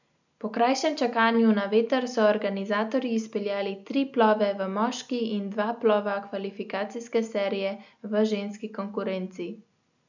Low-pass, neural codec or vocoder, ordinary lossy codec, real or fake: 7.2 kHz; none; none; real